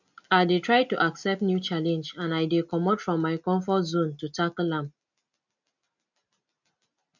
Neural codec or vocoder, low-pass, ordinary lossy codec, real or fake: none; 7.2 kHz; none; real